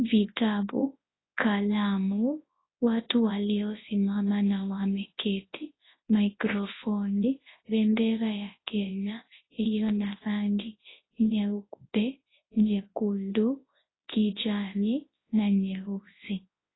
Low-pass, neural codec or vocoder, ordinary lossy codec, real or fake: 7.2 kHz; codec, 24 kHz, 0.9 kbps, WavTokenizer, large speech release; AAC, 16 kbps; fake